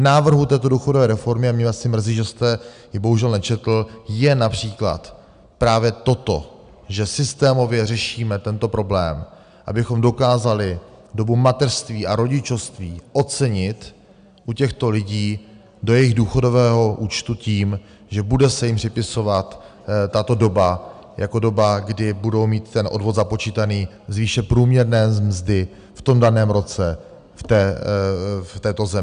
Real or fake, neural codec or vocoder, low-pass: real; none; 9.9 kHz